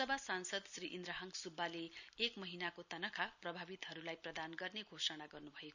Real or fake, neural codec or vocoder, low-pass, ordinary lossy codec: real; none; 7.2 kHz; none